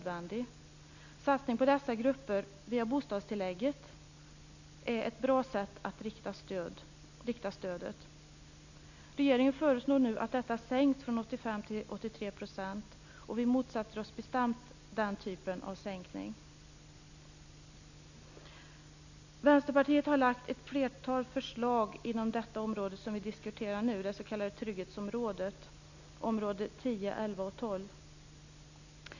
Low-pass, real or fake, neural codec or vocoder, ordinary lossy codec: 7.2 kHz; real; none; none